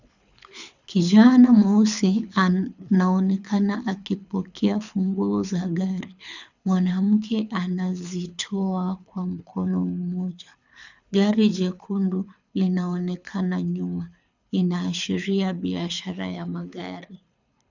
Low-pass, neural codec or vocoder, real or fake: 7.2 kHz; codec, 24 kHz, 6 kbps, HILCodec; fake